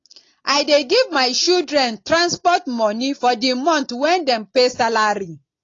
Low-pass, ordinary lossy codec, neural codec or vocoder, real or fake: 7.2 kHz; AAC, 32 kbps; none; real